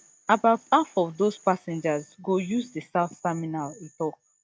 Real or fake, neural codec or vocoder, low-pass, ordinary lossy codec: real; none; none; none